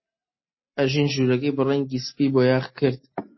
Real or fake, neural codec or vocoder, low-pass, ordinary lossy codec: real; none; 7.2 kHz; MP3, 24 kbps